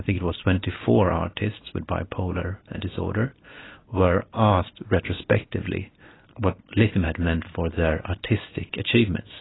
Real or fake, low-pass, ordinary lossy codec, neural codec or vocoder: real; 7.2 kHz; AAC, 16 kbps; none